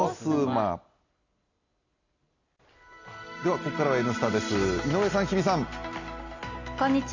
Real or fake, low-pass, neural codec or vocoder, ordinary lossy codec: real; 7.2 kHz; none; none